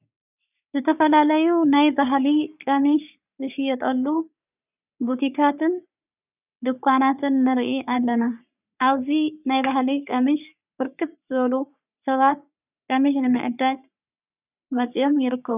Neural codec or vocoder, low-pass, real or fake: codec, 44.1 kHz, 3.4 kbps, Pupu-Codec; 3.6 kHz; fake